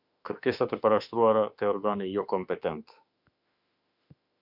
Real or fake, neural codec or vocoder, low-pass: fake; autoencoder, 48 kHz, 32 numbers a frame, DAC-VAE, trained on Japanese speech; 5.4 kHz